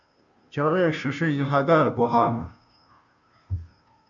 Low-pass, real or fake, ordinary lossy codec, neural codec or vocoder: 7.2 kHz; fake; AAC, 64 kbps; codec, 16 kHz, 0.5 kbps, FunCodec, trained on Chinese and English, 25 frames a second